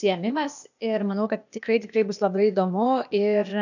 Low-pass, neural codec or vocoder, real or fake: 7.2 kHz; codec, 16 kHz, 0.8 kbps, ZipCodec; fake